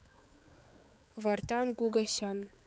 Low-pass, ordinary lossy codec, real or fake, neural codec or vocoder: none; none; fake; codec, 16 kHz, 4 kbps, X-Codec, HuBERT features, trained on balanced general audio